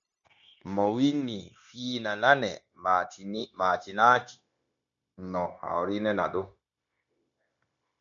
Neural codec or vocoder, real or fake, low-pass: codec, 16 kHz, 0.9 kbps, LongCat-Audio-Codec; fake; 7.2 kHz